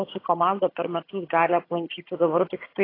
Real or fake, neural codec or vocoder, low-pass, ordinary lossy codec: fake; vocoder, 22.05 kHz, 80 mel bands, HiFi-GAN; 5.4 kHz; AAC, 24 kbps